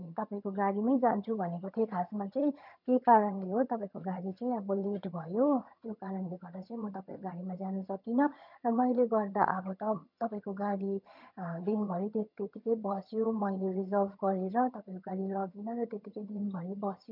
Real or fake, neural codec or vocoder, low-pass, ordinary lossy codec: fake; vocoder, 22.05 kHz, 80 mel bands, HiFi-GAN; 5.4 kHz; none